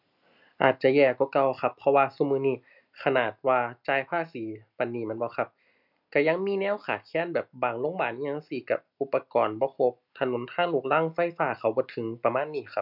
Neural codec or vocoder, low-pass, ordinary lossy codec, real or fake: none; 5.4 kHz; none; real